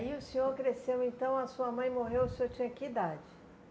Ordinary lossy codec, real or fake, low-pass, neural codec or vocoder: none; real; none; none